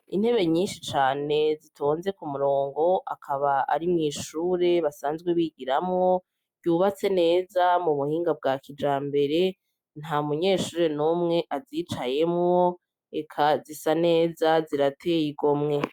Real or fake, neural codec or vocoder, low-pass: real; none; 19.8 kHz